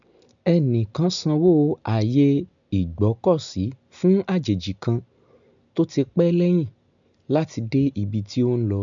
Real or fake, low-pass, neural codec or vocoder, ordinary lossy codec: real; 7.2 kHz; none; none